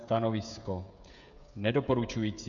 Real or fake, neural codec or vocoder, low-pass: fake; codec, 16 kHz, 16 kbps, FreqCodec, smaller model; 7.2 kHz